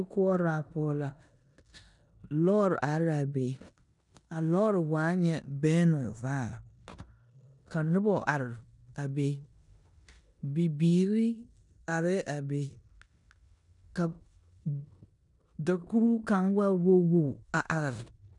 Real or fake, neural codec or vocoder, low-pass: fake; codec, 16 kHz in and 24 kHz out, 0.9 kbps, LongCat-Audio-Codec, four codebook decoder; 10.8 kHz